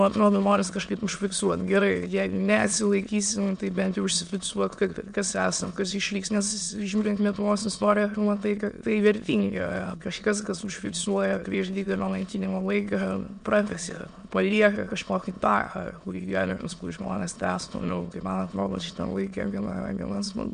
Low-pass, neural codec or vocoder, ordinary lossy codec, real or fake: 9.9 kHz; autoencoder, 22.05 kHz, a latent of 192 numbers a frame, VITS, trained on many speakers; MP3, 64 kbps; fake